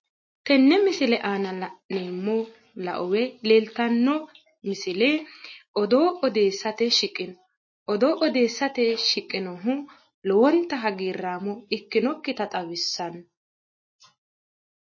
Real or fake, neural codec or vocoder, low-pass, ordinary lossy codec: real; none; 7.2 kHz; MP3, 32 kbps